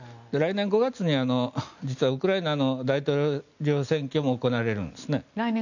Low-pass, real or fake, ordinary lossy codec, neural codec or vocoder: 7.2 kHz; real; none; none